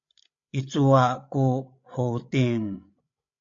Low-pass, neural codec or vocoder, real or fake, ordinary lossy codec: 7.2 kHz; codec, 16 kHz, 16 kbps, FreqCodec, larger model; fake; AAC, 48 kbps